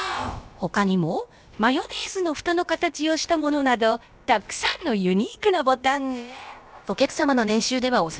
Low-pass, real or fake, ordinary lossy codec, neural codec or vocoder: none; fake; none; codec, 16 kHz, about 1 kbps, DyCAST, with the encoder's durations